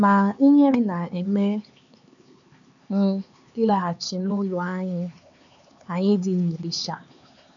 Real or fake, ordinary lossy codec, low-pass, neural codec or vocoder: fake; none; 7.2 kHz; codec, 16 kHz, 4 kbps, X-Codec, HuBERT features, trained on LibriSpeech